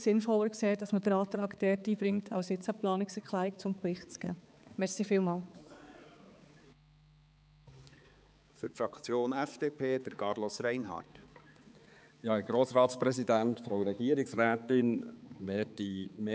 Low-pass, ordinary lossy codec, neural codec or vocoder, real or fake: none; none; codec, 16 kHz, 4 kbps, X-Codec, HuBERT features, trained on balanced general audio; fake